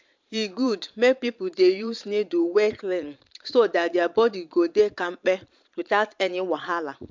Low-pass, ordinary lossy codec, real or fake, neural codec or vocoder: 7.2 kHz; MP3, 64 kbps; fake; vocoder, 22.05 kHz, 80 mel bands, Vocos